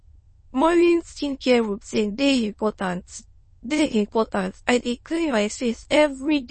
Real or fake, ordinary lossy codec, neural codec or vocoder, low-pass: fake; MP3, 32 kbps; autoencoder, 22.05 kHz, a latent of 192 numbers a frame, VITS, trained on many speakers; 9.9 kHz